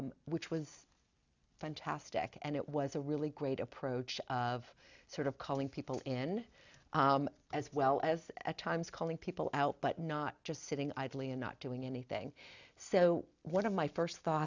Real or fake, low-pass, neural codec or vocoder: real; 7.2 kHz; none